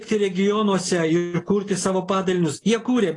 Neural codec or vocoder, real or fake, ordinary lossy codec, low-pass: none; real; AAC, 32 kbps; 10.8 kHz